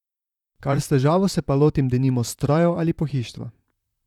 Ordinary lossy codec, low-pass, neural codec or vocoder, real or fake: none; 19.8 kHz; vocoder, 44.1 kHz, 128 mel bands, Pupu-Vocoder; fake